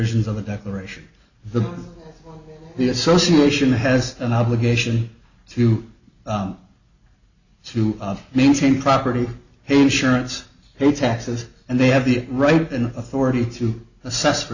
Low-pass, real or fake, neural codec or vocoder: 7.2 kHz; real; none